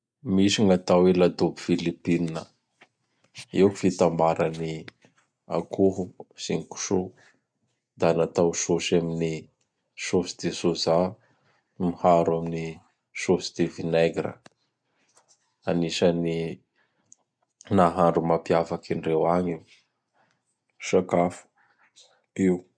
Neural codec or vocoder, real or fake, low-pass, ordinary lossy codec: none; real; 9.9 kHz; none